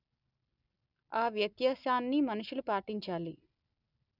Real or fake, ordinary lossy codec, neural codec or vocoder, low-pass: real; none; none; 5.4 kHz